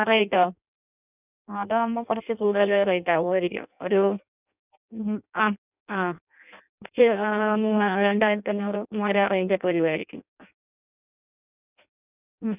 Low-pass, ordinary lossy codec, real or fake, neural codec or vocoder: 3.6 kHz; none; fake; codec, 16 kHz in and 24 kHz out, 0.6 kbps, FireRedTTS-2 codec